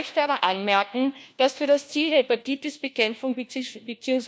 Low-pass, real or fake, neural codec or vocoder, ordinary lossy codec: none; fake; codec, 16 kHz, 1 kbps, FunCodec, trained on LibriTTS, 50 frames a second; none